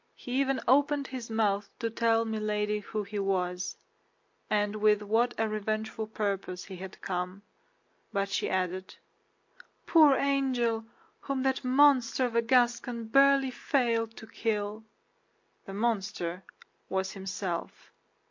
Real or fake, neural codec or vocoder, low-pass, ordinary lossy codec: real; none; 7.2 kHz; MP3, 48 kbps